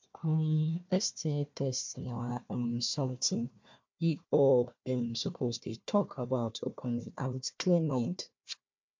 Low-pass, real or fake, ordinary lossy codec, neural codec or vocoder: 7.2 kHz; fake; MP3, 64 kbps; codec, 16 kHz, 1 kbps, FunCodec, trained on Chinese and English, 50 frames a second